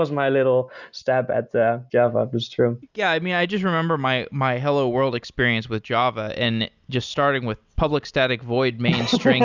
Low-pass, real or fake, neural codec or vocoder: 7.2 kHz; real; none